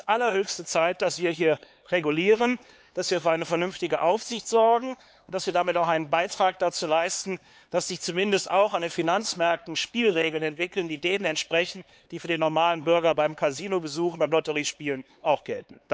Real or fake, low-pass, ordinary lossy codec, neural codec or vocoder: fake; none; none; codec, 16 kHz, 4 kbps, X-Codec, HuBERT features, trained on LibriSpeech